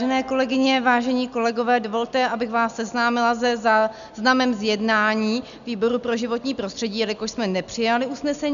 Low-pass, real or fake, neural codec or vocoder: 7.2 kHz; real; none